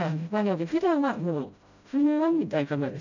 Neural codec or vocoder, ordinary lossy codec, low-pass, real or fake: codec, 16 kHz, 0.5 kbps, FreqCodec, smaller model; none; 7.2 kHz; fake